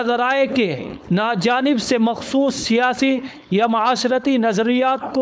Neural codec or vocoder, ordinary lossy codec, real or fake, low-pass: codec, 16 kHz, 4.8 kbps, FACodec; none; fake; none